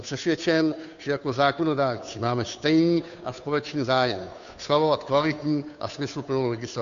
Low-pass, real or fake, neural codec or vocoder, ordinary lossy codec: 7.2 kHz; fake; codec, 16 kHz, 2 kbps, FunCodec, trained on Chinese and English, 25 frames a second; AAC, 96 kbps